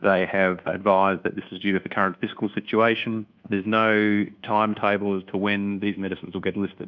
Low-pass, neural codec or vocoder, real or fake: 7.2 kHz; codec, 24 kHz, 1.2 kbps, DualCodec; fake